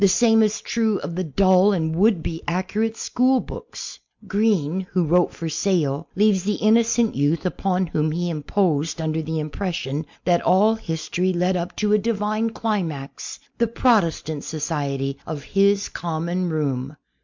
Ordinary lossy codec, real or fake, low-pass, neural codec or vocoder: MP3, 64 kbps; real; 7.2 kHz; none